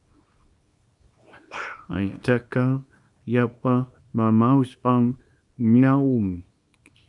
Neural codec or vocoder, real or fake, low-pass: codec, 24 kHz, 0.9 kbps, WavTokenizer, small release; fake; 10.8 kHz